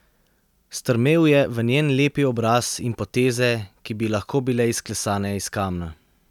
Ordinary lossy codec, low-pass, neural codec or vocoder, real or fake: none; 19.8 kHz; none; real